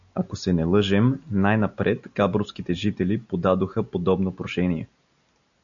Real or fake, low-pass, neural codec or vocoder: real; 7.2 kHz; none